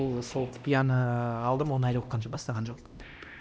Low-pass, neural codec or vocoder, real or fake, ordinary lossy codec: none; codec, 16 kHz, 1 kbps, X-Codec, HuBERT features, trained on LibriSpeech; fake; none